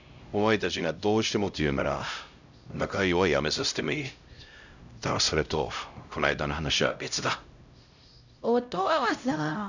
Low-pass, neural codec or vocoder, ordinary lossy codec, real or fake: 7.2 kHz; codec, 16 kHz, 0.5 kbps, X-Codec, HuBERT features, trained on LibriSpeech; none; fake